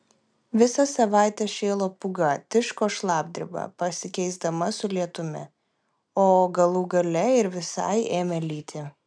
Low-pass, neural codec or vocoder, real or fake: 9.9 kHz; none; real